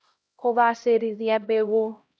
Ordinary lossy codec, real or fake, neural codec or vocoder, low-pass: none; fake; codec, 16 kHz, 0.5 kbps, X-Codec, HuBERT features, trained on LibriSpeech; none